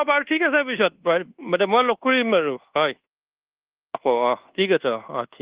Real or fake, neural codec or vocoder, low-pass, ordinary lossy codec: fake; codec, 24 kHz, 1.2 kbps, DualCodec; 3.6 kHz; Opus, 16 kbps